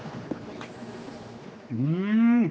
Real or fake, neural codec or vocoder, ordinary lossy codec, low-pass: fake; codec, 16 kHz, 2 kbps, X-Codec, HuBERT features, trained on general audio; none; none